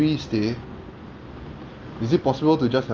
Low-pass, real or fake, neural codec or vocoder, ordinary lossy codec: 7.2 kHz; real; none; Opus, 24 kbps